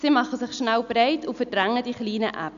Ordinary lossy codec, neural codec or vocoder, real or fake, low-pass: none; none; real; 7.2 kHz